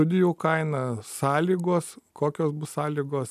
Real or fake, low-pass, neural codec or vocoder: real; 14.4 kHz; none